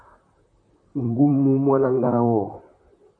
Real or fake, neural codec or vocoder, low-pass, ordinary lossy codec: fake; vocoder, 44.1 kHz, 128 mel bands, Pupu-Vocoder; 9.9 kHz; AAC, 48 kbps